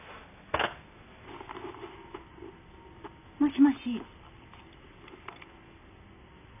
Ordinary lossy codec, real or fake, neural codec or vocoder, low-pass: none; real; none; 3.6 kHz